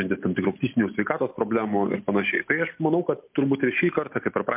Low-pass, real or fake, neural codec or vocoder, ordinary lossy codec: 3.6 kHz; real; none; MP3, 32 kbps